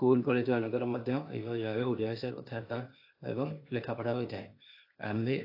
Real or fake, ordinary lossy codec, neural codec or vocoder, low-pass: fake; AAC, 32 kbps; codec, 16 kHz, 0.8 kbps, ZipCodec; 5.4 kHz